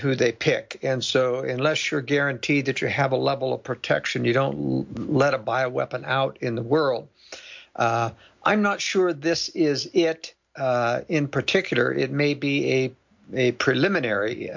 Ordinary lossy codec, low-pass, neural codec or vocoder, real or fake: MP3, 64 kbps; 7.2 kHz; none; real